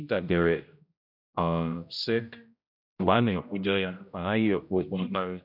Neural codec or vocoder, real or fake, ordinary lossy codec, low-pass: codec, 16 kHz, 0.5 kbps, X-Codec, HuBERT features, trained on general audio; fake; none; 5.4 kHz